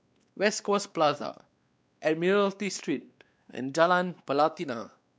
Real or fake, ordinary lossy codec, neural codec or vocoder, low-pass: fake; none; codec, 16 kHz, 2 kbps, X-Codec, WavLM features, trained on Multilingual LibriSpeech; none